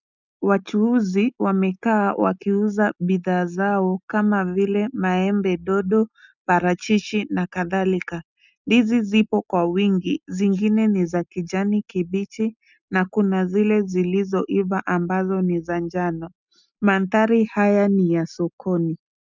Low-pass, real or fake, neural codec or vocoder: 7.2 kHz; real; none